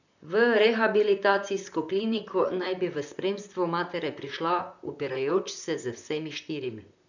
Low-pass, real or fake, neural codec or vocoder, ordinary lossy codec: 7.2 kHz; fake; vocoder, 44.1 kHz, 128 mel bands, Pupu-Vocoder; none